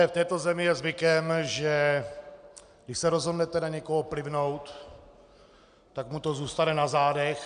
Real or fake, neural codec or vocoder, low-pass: real; none; 9.9 kHz